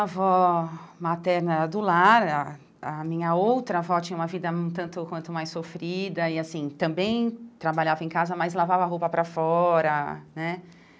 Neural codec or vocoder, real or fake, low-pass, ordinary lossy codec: none; real; none; none